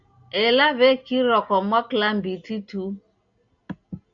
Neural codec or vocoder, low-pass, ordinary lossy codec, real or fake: none; 7.2 kHz; Opus, 64 kbps; real